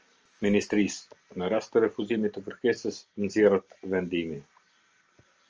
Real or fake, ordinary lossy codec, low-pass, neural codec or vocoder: real; Opus, 24 kbps; 7.2 kHz; none